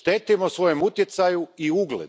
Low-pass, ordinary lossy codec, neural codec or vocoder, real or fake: none; none; none; real